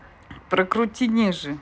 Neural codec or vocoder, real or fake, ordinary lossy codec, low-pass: none; real; none; none